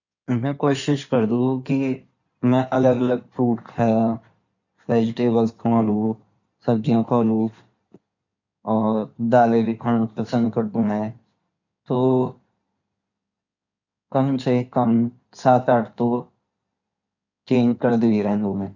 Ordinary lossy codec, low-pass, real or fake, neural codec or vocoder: none; 7.2 kHz; fake; codec, 16 kHz in and 24 kHz out, 2.2 kbps, FireRedTTS-2 codec